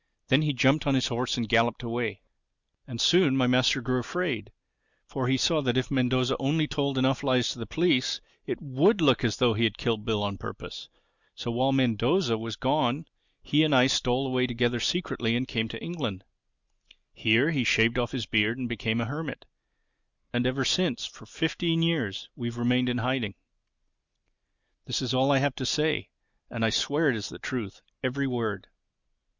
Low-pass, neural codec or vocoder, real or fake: 7.2 kHz; none; real